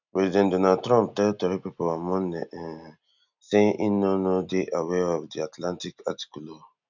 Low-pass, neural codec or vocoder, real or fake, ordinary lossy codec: 7.2 kHz; none; real; none